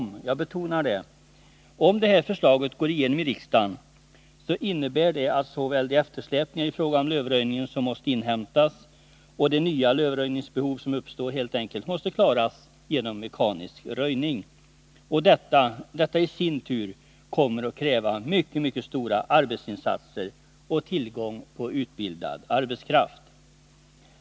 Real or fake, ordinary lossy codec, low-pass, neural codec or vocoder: real; none; none; none